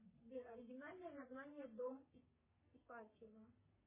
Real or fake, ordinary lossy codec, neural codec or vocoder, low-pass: fake; MP3, 16 kbps; codec, 44.1 kHz, 3.4 kbps, Pupu-Codec; 3.6 kHz